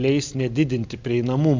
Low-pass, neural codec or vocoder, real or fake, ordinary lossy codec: 7.2 kHz; none; real; MP3, 64 kbps